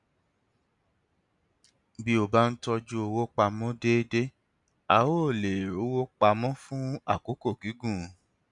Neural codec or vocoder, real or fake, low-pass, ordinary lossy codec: none; real; 10.8 kHz; none